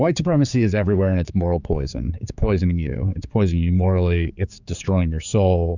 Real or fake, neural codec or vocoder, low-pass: fake; codec, 16 kHz, 4 kbps, X-Codec, HuBERT features, trained on general audio; 7.2 kHz